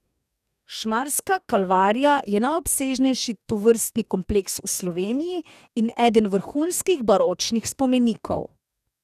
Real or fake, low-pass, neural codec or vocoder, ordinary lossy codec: fake; 14.4 kHz; codec, 44.1 kHz, 2.6 kbps, DAC; none